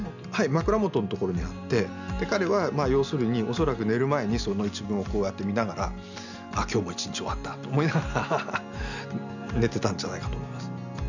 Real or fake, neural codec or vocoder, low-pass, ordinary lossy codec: real; none; 7.2 kHz; none